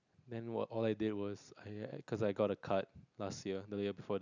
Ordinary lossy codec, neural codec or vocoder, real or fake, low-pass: none; none; real; 7.2 kHz